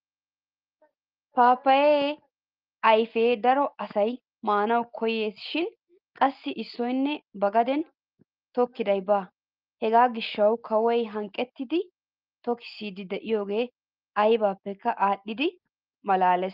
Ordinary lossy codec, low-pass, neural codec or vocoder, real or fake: Opus, 32 kbps; 5.4 kHz; none; real